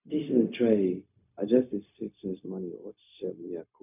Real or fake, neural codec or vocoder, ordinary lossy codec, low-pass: fake; codec, 16 kHz, 0.4 kbps, LongCat-Audio-Codec; none; 3.6 kHz